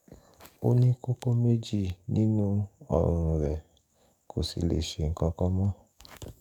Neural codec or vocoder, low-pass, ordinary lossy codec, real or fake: codec, 44.1 kHz, 7.8 kbps, DAC; 19.8 kHz; none; fake